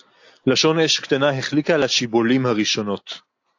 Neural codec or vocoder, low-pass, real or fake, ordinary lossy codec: none; 7.2 kHz; real; AAC, 48 kbps